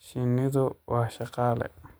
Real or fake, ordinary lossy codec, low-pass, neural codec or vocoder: fake; none; none; vocoder, 44.1 kHz, 128 mel bands every 512 samples, BigVGAN v2